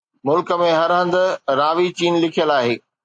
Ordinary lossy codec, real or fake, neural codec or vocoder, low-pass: AAC, 64 kbps; real; none; 9.9 kHz